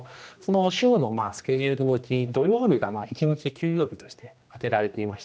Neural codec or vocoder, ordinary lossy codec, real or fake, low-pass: codec, 16 kHz, 1 kbps, X-Codec, HuBERT features, trained on general audio; none; fake; none